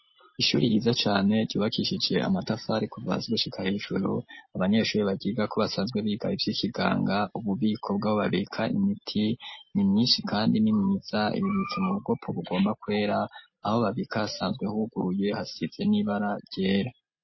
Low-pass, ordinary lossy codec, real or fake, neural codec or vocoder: 7.2 kHz; MP3, 24 kbps; real; none